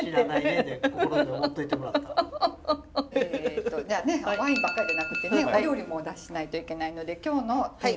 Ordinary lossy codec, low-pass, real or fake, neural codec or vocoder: none; none; real; none